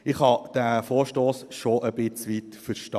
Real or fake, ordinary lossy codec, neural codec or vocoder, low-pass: fake; none; vocoder, 44.1 kHz, 128 mel bands every 512 samples, BigVGAN v2; 14.4 kHz